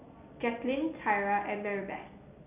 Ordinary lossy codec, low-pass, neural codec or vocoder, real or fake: none; 3.6 kHz; none; real